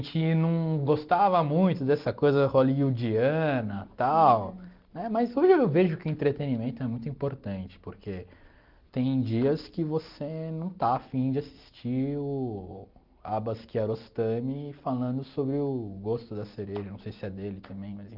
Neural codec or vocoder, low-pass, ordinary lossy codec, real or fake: none; 5.4 kHz; Opus, 32 kbps; real